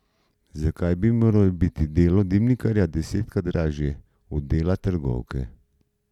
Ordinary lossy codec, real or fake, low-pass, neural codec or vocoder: none; real; 19.8 kHz; none